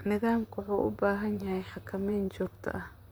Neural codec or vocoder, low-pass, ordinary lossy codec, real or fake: vocoder, 44.1 kHz, 128 mel bands, Pupu-Vocoder; none; none; fake